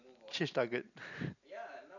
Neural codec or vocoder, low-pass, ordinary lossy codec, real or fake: none; 7.2 kHz; none; real